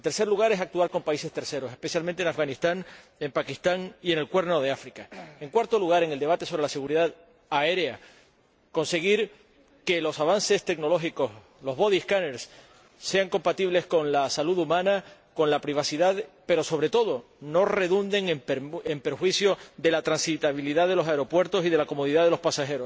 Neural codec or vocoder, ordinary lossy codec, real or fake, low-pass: none; none; real; none